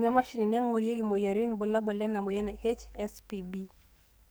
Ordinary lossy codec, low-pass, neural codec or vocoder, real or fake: none; none; codec, 44.1 kHz, 2.6 kbps, SNAC; fake